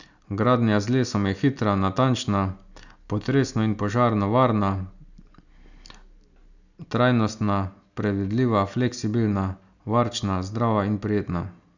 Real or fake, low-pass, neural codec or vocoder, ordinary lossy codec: real; 7.2 kHz; none; none